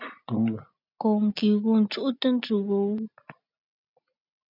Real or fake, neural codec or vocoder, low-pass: real; none; 5.4 kHz